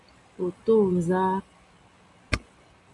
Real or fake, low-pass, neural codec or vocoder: real; 10.8 kHz; none